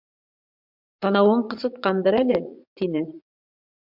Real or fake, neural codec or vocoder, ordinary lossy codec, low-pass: fake; vocoder, 44.1 kHz, 80 mel bands, Vocos; MP3, 48 kbps; 5.4 kHz